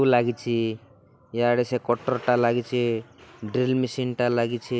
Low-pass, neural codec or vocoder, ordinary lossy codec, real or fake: none; none; none; real